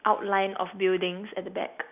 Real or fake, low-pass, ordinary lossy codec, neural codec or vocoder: real; 3.6 kHz; none; none